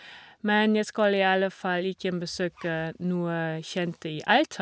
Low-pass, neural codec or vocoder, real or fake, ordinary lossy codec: none; none; real; none